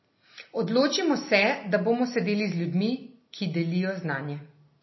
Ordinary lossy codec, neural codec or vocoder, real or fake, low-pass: MP3, 24 kbps; none; real; 7.2 kHz